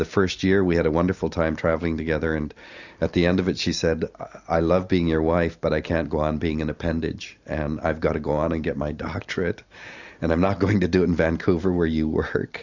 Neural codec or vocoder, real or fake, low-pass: none; real; 7.2 kHz